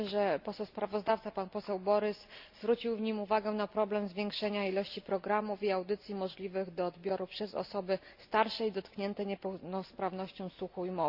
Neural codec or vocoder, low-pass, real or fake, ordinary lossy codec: none; 5.4 kHz; real; Opus, 64 kbps